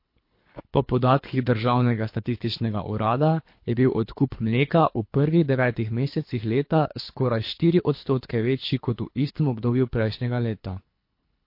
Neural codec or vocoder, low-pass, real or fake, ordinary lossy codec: codec, 24 kHz, 3 kbps, HILCodec; 5.4 kHz; fake; MP3, 32 kbps